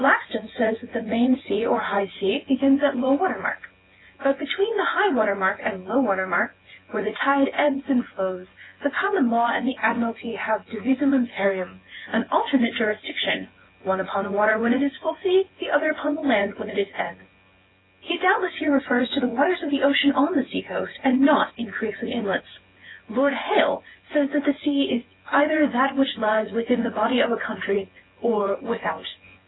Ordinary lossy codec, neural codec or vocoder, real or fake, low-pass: AAC, 16 kbps; vocoder, 24 kHz, 100 mel bands, Vocos; fake; 7.2 kHz